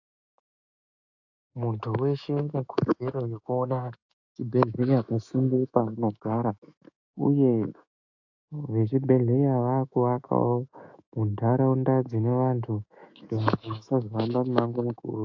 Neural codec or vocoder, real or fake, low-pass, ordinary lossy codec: autoencoder, 48 kHz, 128 numbers a frame, DAC-VAE, trained on Japanese speech; fake; 7.2 kHz; AAC, 48 kbps